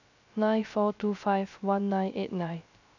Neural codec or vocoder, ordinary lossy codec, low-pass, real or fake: codec, 16 kHz, 0.3 kbps, FocalCodec; none; 7.2 kHz; fake